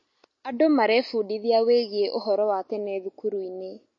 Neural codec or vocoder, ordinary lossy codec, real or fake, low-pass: none; MP3, 32 kbps; real; 7.2 kHz